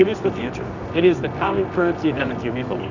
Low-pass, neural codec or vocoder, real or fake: 7.2 kHz; codec, 24 kHz, 0.9 kbps, WavTokenizer, medium music audio release; fake